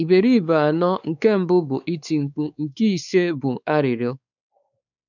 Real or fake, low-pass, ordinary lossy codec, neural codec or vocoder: fake; 7.2 kHz; none; codec, 16 kHz, 4 kbps, X-Codec, WavLM features, trained on Multilingual LibriSpeech